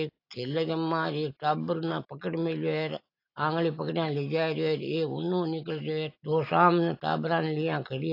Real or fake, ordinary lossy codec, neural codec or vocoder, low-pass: real; AAC, 32 kbps; none; 5.4 kHz